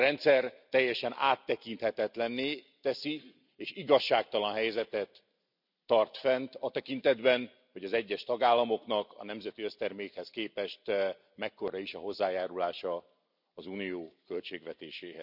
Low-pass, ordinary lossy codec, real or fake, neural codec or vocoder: 5.4 kHz; none; real; none